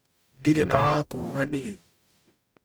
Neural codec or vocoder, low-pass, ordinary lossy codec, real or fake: codec, 44.1 kHz, 0.9 kbps, DAC; none; none; fake